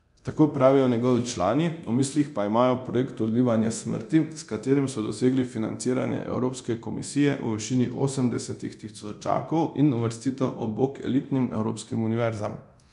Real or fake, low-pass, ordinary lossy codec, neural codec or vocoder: fake; 10.8 kHz; MP3, 96 kbps; codec, 24 kHz, 0.9 kbps, DualCodec